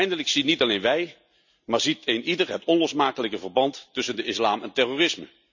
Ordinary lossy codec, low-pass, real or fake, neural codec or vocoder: none; 7.2 kHz; real; none